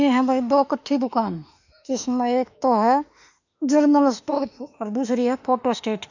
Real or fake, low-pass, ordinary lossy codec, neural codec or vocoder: fake; 7.2 kHz; none; autoencoder, 48 kHz, 32 numbers a frame, DAC-VAE, trained on Japanese speech